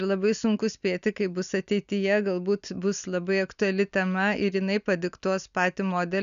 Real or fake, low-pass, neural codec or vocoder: real; 7.2 kHz; none